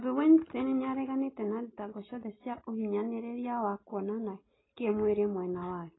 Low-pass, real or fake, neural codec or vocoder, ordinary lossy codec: 7.2 kHz; real; none; AAC, 16 kbps